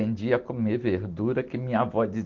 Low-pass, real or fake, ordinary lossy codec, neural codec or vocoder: 7.2 kHz; real; Opus, 16 kbps; none